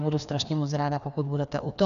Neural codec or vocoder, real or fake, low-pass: codec, 16 kHz, 2 kbps, FreqCodec, larger model; fake; 7.2 kHz